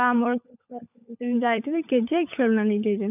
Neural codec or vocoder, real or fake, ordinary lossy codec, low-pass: codec, 16 kHz, 16 kbps, FunCodec, trained on LibriTTS, 50 frames a second; fake; none; 3.6 kHz